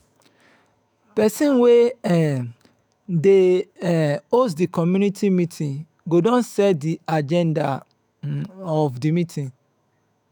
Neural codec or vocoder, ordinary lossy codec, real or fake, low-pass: autoencoder, 48 kHz, 128 numbers a frame, DAC-VAE, trained on Japanese speech; none; fake; none